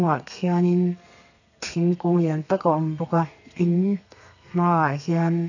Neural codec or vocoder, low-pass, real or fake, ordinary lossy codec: codec, 32 kHz, 1.9 kbps, SNAC; 7.2 kHz; fake; none